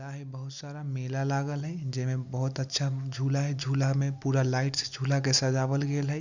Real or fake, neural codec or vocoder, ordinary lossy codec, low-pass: real; none; none; 7.2 kHz